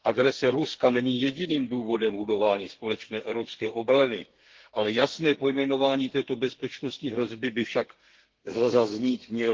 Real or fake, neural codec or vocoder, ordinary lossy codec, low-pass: fake; codec, 32 kHz, 1.9 kbps, SNAC; Opus, 16 kbps; 7.2 kHz